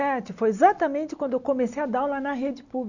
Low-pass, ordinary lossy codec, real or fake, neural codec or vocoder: 7.2 kHz; none; real; none